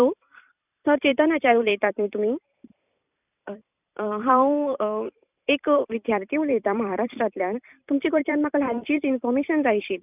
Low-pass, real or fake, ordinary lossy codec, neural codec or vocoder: 3.6 kHz; fake; none; vocoder, 44.1 kHz, 128 mel bands every 256 samples, BigVGAN v2